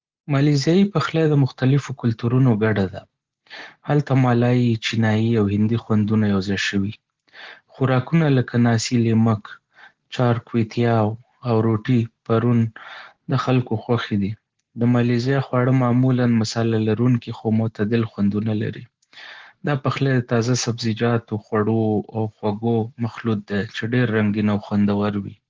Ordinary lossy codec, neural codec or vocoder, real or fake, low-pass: Opus, 16 kbps; none; real; 7.2 kHz